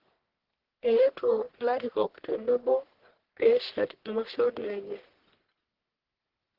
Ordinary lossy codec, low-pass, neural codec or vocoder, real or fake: Opus, 16 kbps; 5.4 kHz; codec, 44.1 kHz, 1.7 kbps, Pupu-Codec; fake